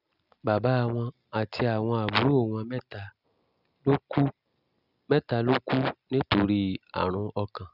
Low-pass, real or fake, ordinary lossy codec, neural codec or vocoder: 5.4 kHz; real; none; none